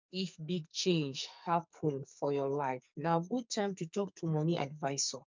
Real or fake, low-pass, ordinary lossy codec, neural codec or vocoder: fake; 7.2 kHz; MP3, 64 kbps; codec, 44.1 kHz, 2.6 kbps, SNAC